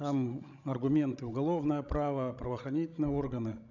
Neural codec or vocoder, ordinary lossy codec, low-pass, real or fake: codec, 16 kHz, 16 kbps, FreqCodec, larger model; none; 7.2 kHz; fake